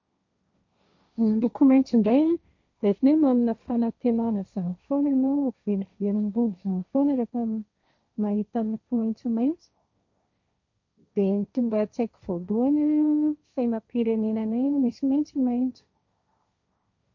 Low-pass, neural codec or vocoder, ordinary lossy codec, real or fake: 7.2 kHz; codec, 16 kHz, 1.1 kbps, Voila-Tokenizer; MP3, 64 kbps; fake